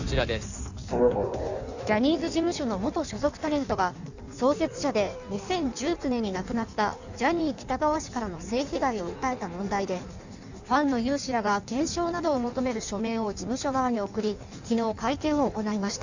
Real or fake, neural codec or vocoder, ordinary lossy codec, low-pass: fake; codec, 16 kHz in and 24 kHz out, 1.1 kbps, FireRedTTS-2 codec; none; 7.2 kHz